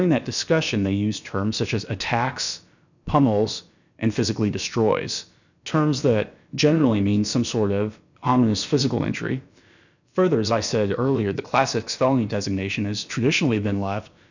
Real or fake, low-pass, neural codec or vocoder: fake; 7.2 kHz; codec, 16 kHz, about 1 kbps, DyCAST, with the encoder's durations